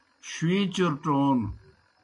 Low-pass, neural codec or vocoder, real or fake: 10.8 kHz; none; real